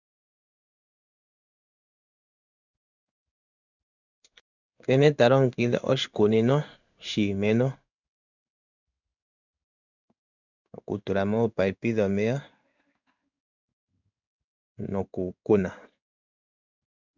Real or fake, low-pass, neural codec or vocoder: fake; 7.2 kHz; codec, 16 kHz in and 24 kHz out, 1 kbps, XY-Tokenizer